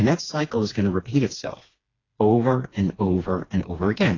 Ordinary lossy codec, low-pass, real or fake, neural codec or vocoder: AAC, 32 kbps; 7.2 kHz; fake; codec, 16 kHz, 2 kbps, FreqCodec, smaller model